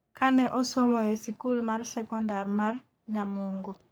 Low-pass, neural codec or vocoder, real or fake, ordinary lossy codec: none; codec, 44.1 kHz, 3.4 kbps, Pupu-Codec; fake; none